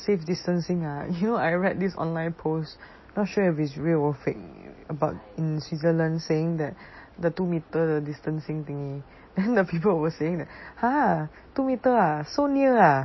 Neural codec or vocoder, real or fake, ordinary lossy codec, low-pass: none; real; MP3, 24 kbps; 7.2 kHz